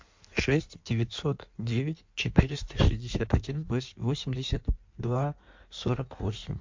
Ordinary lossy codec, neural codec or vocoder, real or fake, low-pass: MP3, 48 kbps; codec, 16 kHz in and 24 kHz out, 1.1 kbps, FireRedTTS-2 codec; fake; 7.2 kHz